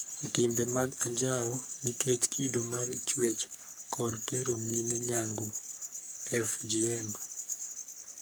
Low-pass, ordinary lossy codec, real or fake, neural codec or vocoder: none; none; fake; codec, 44.1 kHz, 3.4 kbps, Pupu-Codec